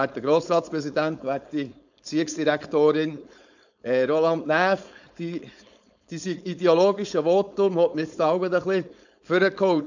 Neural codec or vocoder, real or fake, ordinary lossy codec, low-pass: codec, 16 kHz, 4.8 kbps, FACodec; fake; none; 7.2 kHz